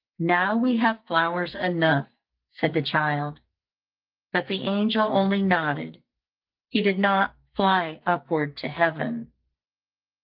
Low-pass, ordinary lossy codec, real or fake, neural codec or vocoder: 5.4 kHz; Opus, 24 kbps; fake; codec, 44.1 kHz, 2.6 kbps, SNAC